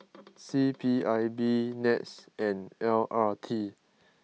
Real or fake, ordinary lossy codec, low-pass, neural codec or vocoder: real; none; none; none